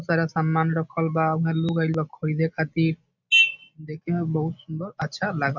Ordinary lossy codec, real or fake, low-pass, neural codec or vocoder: none; real; 7.2 kHz; none